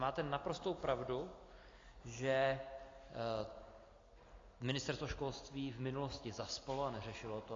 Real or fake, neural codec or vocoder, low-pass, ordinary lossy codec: real; none; 7.2 kHz; AAC, 32 kbps